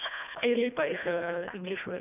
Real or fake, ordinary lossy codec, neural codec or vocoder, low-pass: fake; none; codec, 24 kHz, 1.5 kbps, HILCodec; 3.6 kHz